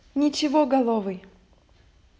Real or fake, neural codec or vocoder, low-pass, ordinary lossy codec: real; none; none; none